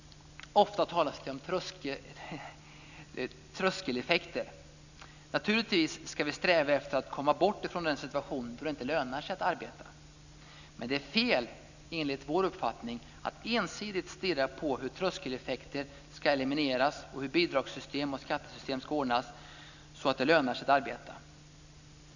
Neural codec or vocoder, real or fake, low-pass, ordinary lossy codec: none; real; 7.2 kHz; none